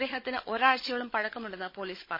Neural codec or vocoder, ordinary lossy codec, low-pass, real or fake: codec, 16 kHz, 16 kbps, FunCodec, trained on Chinese and English, 50 frames a second; MP3, 24 kbps; 5.4 kHz; fake